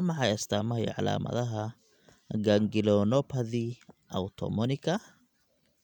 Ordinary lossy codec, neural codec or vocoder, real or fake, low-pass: none; none; real; 19.8 kHz